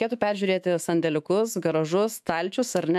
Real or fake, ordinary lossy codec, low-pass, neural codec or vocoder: fake; MP3, 96 kbps; 14.4 kHz; autoencoder, 48 kHz, 128 numbers a frame, DAC-VAE, trained on Japanese speech